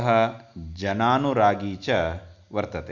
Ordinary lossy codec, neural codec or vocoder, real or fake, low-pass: none; none; real; 7.2 kHz